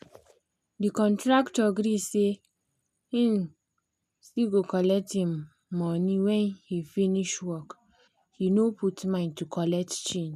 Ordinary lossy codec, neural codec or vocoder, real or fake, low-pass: none; none; real; 14.4 kHz